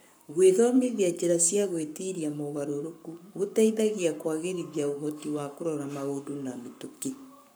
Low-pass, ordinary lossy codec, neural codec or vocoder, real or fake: none; none; codec, 44.1 kHz, 7.8 kbps, Pupu-Codec; fake